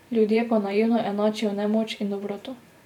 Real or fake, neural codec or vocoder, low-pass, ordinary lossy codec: fake; vocoder, 44.1 kHz, 128 mel bands every 512 samples, BigVGAN v2; 19.8 kHz; none